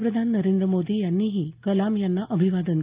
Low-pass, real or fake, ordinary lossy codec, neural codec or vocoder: 3.6 kHz; real; Opus, 32 kbps; none